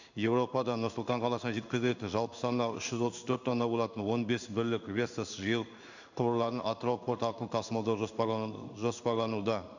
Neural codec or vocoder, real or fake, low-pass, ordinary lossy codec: codec, 16 kHz in and 24 kHz out, 1 kbps, XY-Tokenizer; fake; 7.2 kHz; none